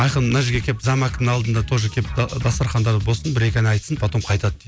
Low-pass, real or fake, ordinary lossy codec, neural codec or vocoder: none; real; none; none